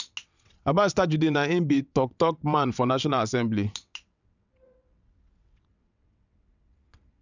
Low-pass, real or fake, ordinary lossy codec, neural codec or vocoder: 7.2 kHz; fake; none; vocoder, 22.05 kHz, 80 mel bands, WaveNeXt